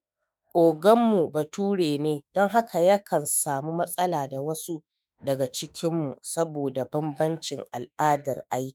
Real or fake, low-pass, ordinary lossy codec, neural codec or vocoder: fake; none; none; autoencoder, 48 kHz, 32 numbers a frame, DAC-VAE, trained on Japanese speech